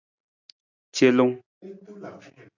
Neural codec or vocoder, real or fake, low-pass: none; real; 7.2 kHz